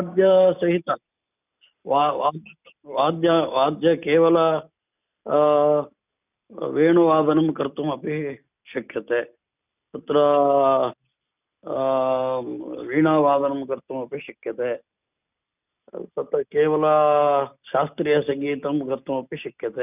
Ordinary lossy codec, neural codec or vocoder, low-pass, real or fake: none; none; 3.6 kHz; real